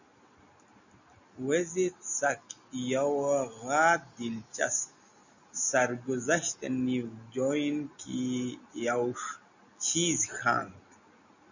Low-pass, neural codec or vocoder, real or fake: 7.2 kHz; none; real